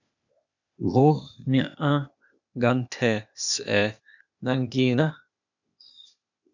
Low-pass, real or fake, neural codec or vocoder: 7.2 kHz; fake; codec, 16 kHz, 0.8 kbps, ZipCodec